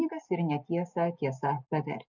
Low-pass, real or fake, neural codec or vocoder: 7.2 kHz; real; none